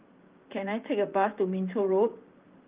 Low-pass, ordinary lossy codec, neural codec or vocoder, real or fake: 3.6 kHz; Opus, 32 kbps; vocoder, 44.1 kHz, 128 mel bands, Pupu-Vocoder; fake